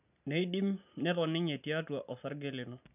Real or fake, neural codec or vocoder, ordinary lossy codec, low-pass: real; none; none; 3.6 kHz